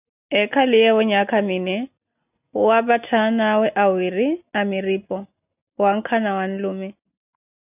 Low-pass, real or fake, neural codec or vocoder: 3.6 kHz; real; none